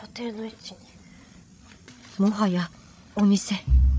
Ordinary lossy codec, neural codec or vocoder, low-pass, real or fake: none; codec, 16 kHz, 8 kbps, FreqCodec, larger model; none; fake